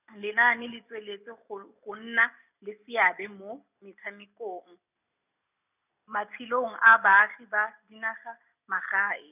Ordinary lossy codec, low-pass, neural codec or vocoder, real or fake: none; 3.6 kHz; none; real